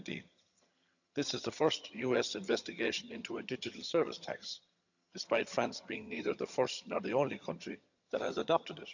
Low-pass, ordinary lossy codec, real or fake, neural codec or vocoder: 7.2 kHz; none; fake; vocoder, 22.05 kHz, 80 mel bands, HiFi-GAN